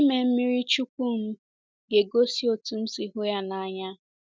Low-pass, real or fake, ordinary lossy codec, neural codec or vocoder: none; real; none; none